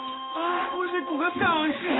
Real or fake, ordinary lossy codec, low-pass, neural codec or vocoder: fake; AAC, 16 kbps; 7.2 kHz; codec, 16 kHz in and 24 kHz out, 1 kbps, XY-Tokenizer